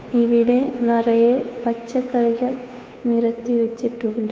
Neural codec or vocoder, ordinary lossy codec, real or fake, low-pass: codec, 16 kHz, 2 kbps, FunCodec, trained on Chinese and English, 25 frames a second; none; fake; none